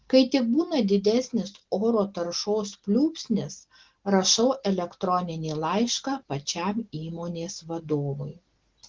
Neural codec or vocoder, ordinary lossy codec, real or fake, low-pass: none; Opus, 16 kbps; real; 7.2 kHz